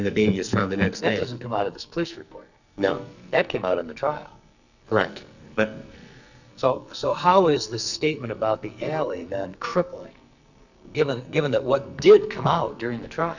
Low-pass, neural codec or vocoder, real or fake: 7.2 kHz; codec, 44.1 kHz, 2.6 kbps, SNAC; fake